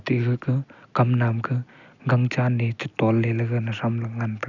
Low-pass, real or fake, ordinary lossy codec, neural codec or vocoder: 7.2 kHz; real; none; none